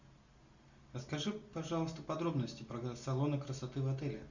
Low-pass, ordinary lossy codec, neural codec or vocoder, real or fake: 7.2 kHz; Opus, 64 kbps; none; real